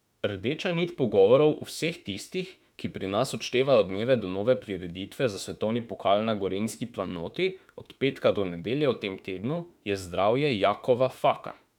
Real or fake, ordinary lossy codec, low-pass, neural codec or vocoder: fake; none; 19.8 kHz; autoencoder, 48 kHz, 32 numbers a frame, DAC-VAE, trained on Japanese speech